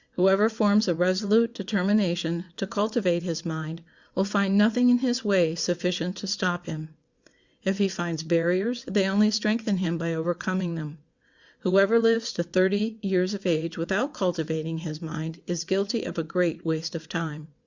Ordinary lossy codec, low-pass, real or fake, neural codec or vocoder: Opus, 64 kbps; 7.2 kHz; fake; vocoder, 22.05 kHz, 80 mel bands, WaveNeXt